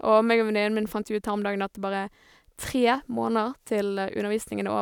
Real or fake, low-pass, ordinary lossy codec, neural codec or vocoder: real; 19.8 kHz; none; none